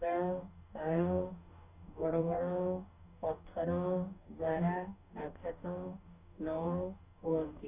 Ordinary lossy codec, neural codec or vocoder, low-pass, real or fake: none; codec, 44.1 kHz, 2.6 kbps, DAC; 3.6 kHz; fake